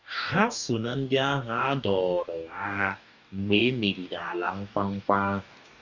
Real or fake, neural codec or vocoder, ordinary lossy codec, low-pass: fake; codec, 44.1 kHz, 2.6 kbps, DAC; none; 7.2 kHz